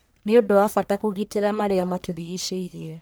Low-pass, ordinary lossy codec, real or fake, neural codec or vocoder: none; none; fake; codec, 44.1 kHz, 1.7 kbps, Pupu-Codec